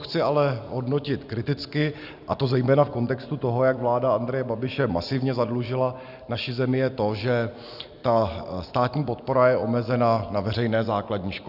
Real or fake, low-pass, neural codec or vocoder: real; 5.4 kHz; none